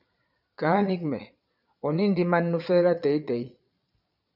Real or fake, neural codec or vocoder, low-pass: fake; vocoder, 22.05 kHz, 80 mel bands, Vocos; 5.4 kHz